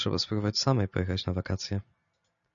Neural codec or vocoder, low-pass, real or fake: none; 7.2 kHz; real